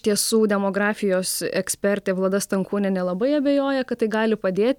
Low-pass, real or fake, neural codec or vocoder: 19.8 kHz; real; none